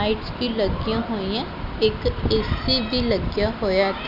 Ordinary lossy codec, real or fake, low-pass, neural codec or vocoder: none; real; 5.4 kHz; none